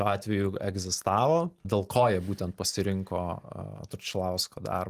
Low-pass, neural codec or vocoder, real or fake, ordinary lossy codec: 14.4 kHz; none; real; Opus, 24 kbps